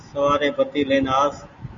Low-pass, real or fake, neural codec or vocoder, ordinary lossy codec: 7.2 kHz; real; none; Opus, 64 kbps